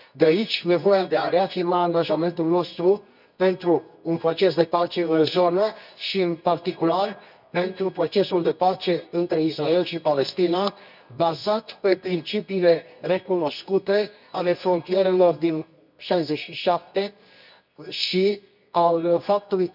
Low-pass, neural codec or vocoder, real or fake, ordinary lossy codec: 5.4 kHz; codec, 24 kHz, 0.9 kbps, WavTokenizer, medium music audio release; fake; none